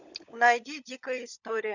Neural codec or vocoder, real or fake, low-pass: codec, 16 kHz, 16 kbps, FunCodec, trained on LibriTTS, 50 frames a second; fake; 7.2 kHz